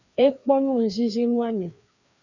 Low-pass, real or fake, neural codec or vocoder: 7.2 kHz; fake; codec, 16 kHz, 2 kbps, FreqCodec, larger model